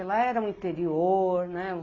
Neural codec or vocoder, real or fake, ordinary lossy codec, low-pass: none; real; AAC, 32 kbps; 7.2 kHz